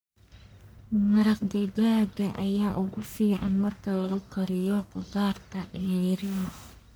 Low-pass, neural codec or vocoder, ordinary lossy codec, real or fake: none; codec, 44.1 kHz, 1.7 kbps, Pupu-Codec; none; fake